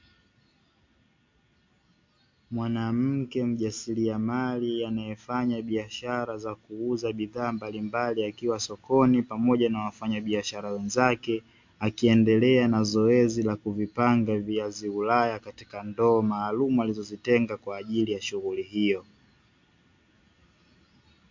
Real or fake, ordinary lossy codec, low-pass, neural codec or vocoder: real; MP3, 48 kbps; 7.2 kHz; none